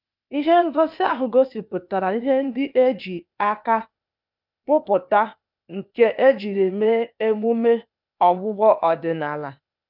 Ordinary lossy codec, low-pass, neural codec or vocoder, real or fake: none; 5.4 kHz; codec, 16 kHz, 0.8 kbps, ZipCodec; fake